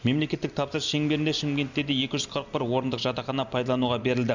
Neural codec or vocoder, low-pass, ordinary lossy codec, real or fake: none; 7.2 kHz; none; real